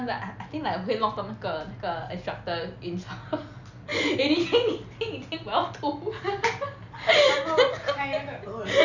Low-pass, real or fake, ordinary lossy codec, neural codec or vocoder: 7.2 kHz; real; none; none